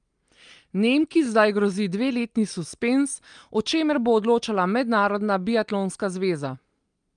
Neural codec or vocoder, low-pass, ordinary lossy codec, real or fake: none; 9.9 kHz; Opus, 32 kbps; real